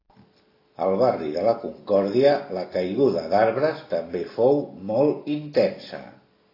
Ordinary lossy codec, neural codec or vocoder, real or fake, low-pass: AAC, 24 kbps; none; real; 5.4 kHz